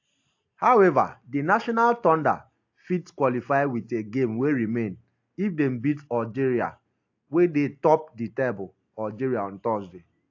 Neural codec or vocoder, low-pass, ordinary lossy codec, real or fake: none; 7.2 kHz; AAC, 48 kbps; real